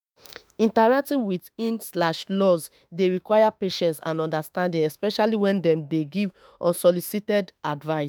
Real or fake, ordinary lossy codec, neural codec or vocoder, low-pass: fake; none; autoencoder, 48 kHz, 32 numbers a frame, DAC-VAE, trained on Japanese speech; none